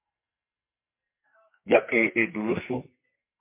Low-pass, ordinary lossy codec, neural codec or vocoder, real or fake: 3.6 kHz; MP3, 24 kbps; codec, 44.1 kHz, 2.6 kbps, SNAC; fake